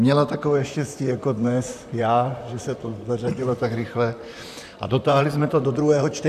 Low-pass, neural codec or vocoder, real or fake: 14.4 kHz; vocoder, 44.1 kHz, 128 mel bands, Pupu-Vocoder; fake